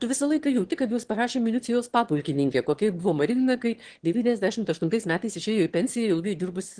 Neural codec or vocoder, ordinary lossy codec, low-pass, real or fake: autoencoder, 22.05 kHz, a latent of 192 numbers a frame, VITS, trained on one speaker; Opus, 16 kbps; 9.9 kHz; fake